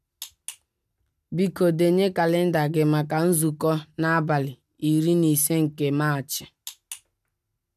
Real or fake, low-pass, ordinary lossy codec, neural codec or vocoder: real; 14.4 kHz; none; none